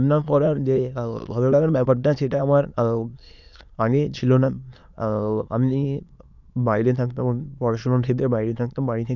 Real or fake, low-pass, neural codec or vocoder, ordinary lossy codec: fake; 7.2 kHz; autoencoder, 22.05 kHz, a latent of 192 numbers a frame, VITS, trained on many speakers; none